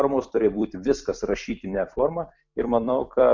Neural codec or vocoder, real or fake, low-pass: vocoder, 44.1 kHz, 128 mel bands every 256 samples, BigVGAN v2; fake; 7.2 kHz